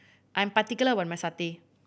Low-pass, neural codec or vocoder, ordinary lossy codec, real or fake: none; none; none; real